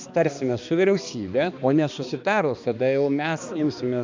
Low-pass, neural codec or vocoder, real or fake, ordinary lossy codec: 7.2 kHz; codec, 16 kHz, 2 kbps, X-Codec, HuBERT features, trained on balanced general audio; fake; MP3, 64 kbps